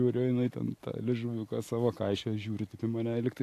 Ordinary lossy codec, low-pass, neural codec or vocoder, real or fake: AAC, 64 kbps; 14.4 kHz; none; real